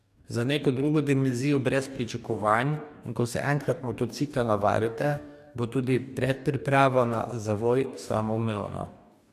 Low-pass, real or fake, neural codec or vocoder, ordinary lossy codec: 14.4 kHz; fake; codec, 44.1 kHz, 2.6 kbps, DAC; none